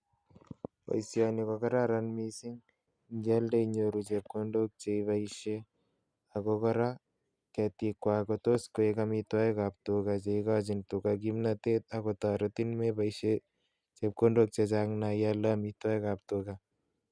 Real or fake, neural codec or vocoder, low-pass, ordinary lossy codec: real; none; 9.9 kHz; none